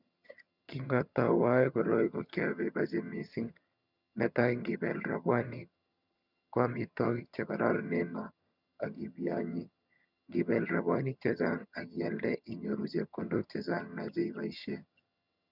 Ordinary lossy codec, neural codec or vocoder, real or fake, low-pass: none; vocoder, 22.05 kHz, 80 mel bands, HiFi-GAN; fake; 5.4 kHz